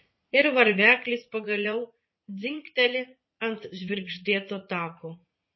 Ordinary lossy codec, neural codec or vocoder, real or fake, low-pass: MP3, 24 kbps; vocoder, 44.1 kHz, 80 mel bands, Vocos; fake; 7.2 kHz